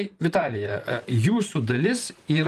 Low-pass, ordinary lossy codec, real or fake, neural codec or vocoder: 14.4 kHz; Opus, 32 kbps; fake; vocoder, 44.1 kHz, 128 mel bands, Pupu-Vocoder